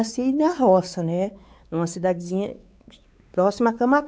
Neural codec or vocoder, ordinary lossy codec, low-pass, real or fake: codec, 16 kHz, 4 kbps, X-Codec, WavLM features, trained on Multilingual LibriSpeech; none; none; fake